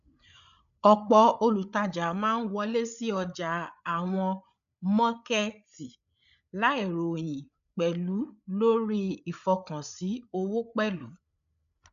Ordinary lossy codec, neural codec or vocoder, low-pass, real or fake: none; codec, 16 kHz, 8 kbps, FreqCodec, larger model; 7.2 kHz; fake